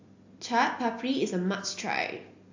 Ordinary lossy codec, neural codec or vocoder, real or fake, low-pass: AAC, 48 kbps; none; real; 7.2 kHz